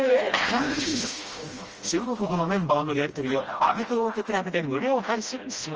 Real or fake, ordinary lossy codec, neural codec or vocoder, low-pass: fake; Opus, 24 kbps; codec, 16 kHz, 1 kbps, FreqCodec, smaller model; 7.2 kHz